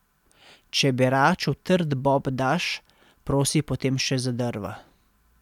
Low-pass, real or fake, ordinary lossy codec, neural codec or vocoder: 19.8 kHz; real; none; none